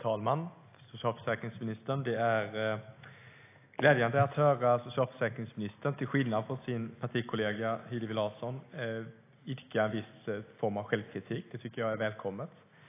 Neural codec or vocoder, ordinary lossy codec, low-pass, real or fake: none; AAC, 24 kbps; 3.6 kHz; real